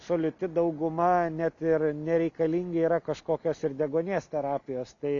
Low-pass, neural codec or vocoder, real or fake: 7.2 kHz; none; real